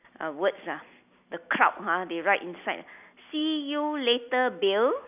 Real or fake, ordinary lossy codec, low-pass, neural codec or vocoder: real; none; 3.6 kHz; none